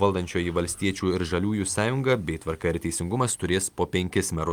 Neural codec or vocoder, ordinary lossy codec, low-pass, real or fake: none; Opus, 32 kbps; 19.8 kHz; real